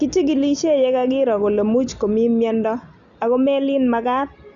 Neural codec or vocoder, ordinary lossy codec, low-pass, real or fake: none; Opus, 64 kbps; 7.2 kHz; real